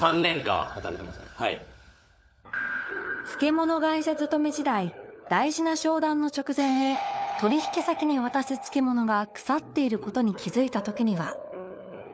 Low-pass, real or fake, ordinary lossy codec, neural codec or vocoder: none; fake; none; codec, 16 kHz, 4 kbps, FunCodec, trained on LibriTTS, 50 frames a second